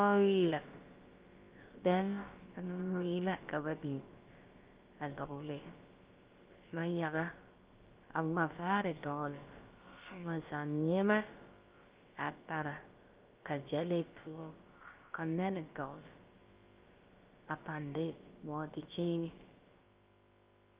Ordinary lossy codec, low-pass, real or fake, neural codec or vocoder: Opus, 32 kbps; 3.6 kHz; fake; codec, 16 kHz, about 1 kbps, DyCAST, with the encoder's durations